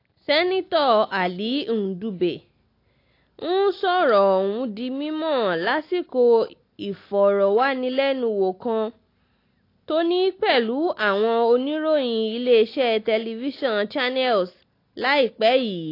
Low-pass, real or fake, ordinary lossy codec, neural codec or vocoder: 5.4 kHz; real; AAC, 32 kbps; none